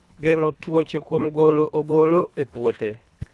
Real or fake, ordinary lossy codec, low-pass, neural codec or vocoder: fake; none; none; codec, 24 kHz, 1.5 kbps, HILCodec